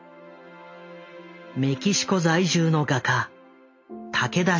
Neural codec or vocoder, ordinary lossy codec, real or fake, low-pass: none; MP3, 48 kbps; real; 7.2 kHz